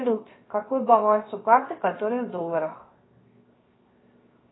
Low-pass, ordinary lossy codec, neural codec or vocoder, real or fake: 7.2 kHz; AAC, 16 kbps; codec, 16 kHz, 0.7 kbps, FocalCodec; fake